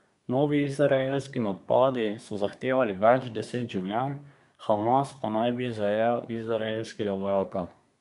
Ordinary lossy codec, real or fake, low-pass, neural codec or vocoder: none; fake; 10.8 kHz; codec, 24 kHz, 1 kbps, SNAC